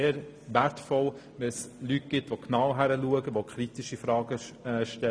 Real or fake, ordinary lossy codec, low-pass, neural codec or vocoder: real; none; none; none